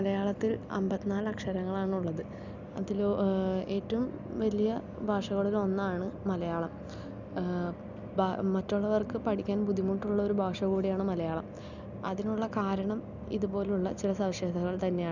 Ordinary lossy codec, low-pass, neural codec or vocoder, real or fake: none; 7.2 kHz; none; real